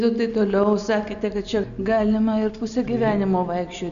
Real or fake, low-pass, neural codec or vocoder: real; 7.2 kHz; none